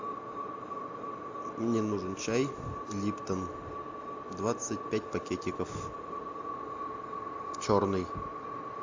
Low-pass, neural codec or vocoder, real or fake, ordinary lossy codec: 7.2 kHz; none; real; AAC, 48 kbps